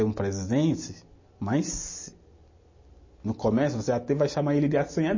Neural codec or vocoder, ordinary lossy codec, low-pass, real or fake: none; MP3, 32 kbps; 7.2 kHz; real